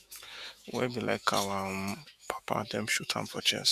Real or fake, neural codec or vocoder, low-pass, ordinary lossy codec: fake; autoencoder, 48 kHz, 128 numbers a frame, DAC-VAE, trained on Japanese speech; 14.4 kHz; Opus, 64 kbps